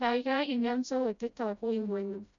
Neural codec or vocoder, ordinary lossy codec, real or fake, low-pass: codec, 16 kHz, 0.5 kbps, FreqCodec, smaller model; none; fake; 7.2 kHz